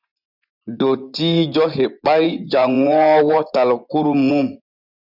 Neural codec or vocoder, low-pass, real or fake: vocoder, 24 kHz, 100 mel bands, Vocos; 5.4 kHz; fake